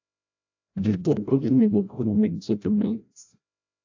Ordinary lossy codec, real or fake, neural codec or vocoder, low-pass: AAC, 48 kbps; fake; codec, 16 kHz, 0.5 kbps, FreqCodec, larger model; 7.2 kHz